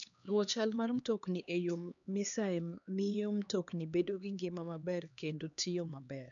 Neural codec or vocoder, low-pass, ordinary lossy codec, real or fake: codec, 16 kHz, 2 kbps, X-Codec, HuBERT features, trained on LibriSpeech; 7.2 kHz; none; fake